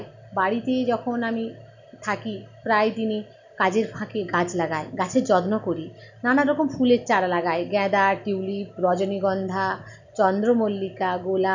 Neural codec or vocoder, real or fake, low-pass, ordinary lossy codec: none; real; 7.2 kHz; none